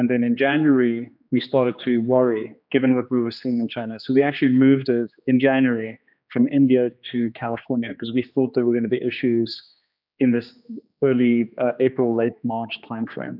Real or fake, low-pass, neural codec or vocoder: fake; 5.4 kHz; codec, 16 kHz, 2 kbps, X-Codec, HuBERT features, trained on general audio